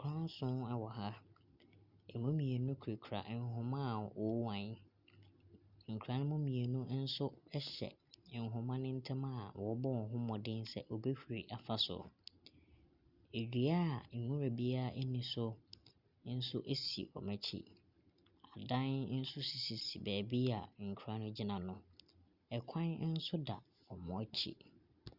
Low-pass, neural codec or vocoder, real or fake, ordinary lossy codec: 5.4 kHz; none; real; Opus, 64 kbps